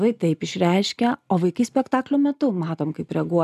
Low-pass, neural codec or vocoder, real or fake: 14.4 kHz; none; real